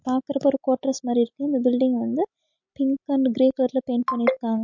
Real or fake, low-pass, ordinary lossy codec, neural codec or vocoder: real; 7.2 kHz; MP3, 48 kbps; none